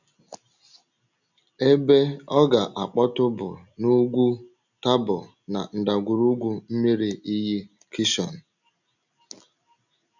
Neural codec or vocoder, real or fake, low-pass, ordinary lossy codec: none; real; 7.2 kHz; none